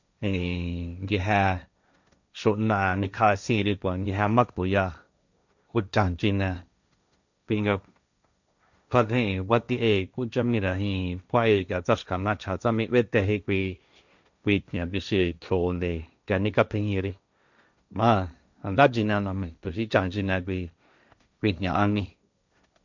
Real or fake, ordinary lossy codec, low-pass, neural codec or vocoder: fake; none; 7.2 kHz; codec, 16 kHz, 1.1 kbps, Voila-Tokenizer